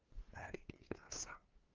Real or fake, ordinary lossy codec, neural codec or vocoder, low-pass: fake; Opus, 24 kbps; codec, 16 kHz, 2 kbps, FunCodec, trained on LibriTTS, 25 frames a second; 7.2 kHz